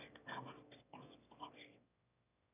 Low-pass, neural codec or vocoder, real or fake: 3.6 kHz; autoencoder, 22.05 kHz, a latent of 192 numbers a frame, VITS, trained on one speaker; fake